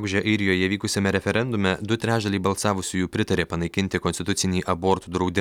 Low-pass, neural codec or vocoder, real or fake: 19.8 kHz; none; real